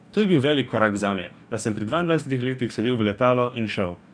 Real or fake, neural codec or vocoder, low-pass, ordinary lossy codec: fake; codec, 44.1 kHz, 2.6 kbps, DAC; 9.9 kHz; none